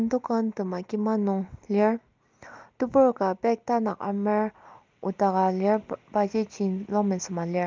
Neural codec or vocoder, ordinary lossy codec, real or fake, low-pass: none; Opus, 24 kbps; real; 7.2 kHz